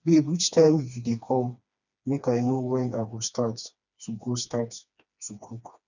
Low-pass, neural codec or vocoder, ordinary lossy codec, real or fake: 7.2 kHz; codec, 16 kHz, 2 kbps, FreqCodec, smaller model; none; fake